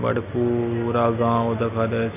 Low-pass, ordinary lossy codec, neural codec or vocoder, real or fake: 3.6 kHz; none; none; real